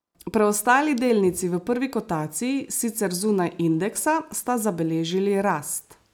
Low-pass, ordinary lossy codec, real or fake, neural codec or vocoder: none; none; real; none